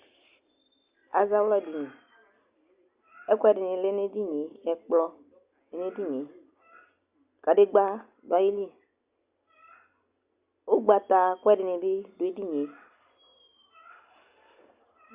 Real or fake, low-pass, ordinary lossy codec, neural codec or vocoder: real; 3.6 kHz; Opus, 64 kbps; none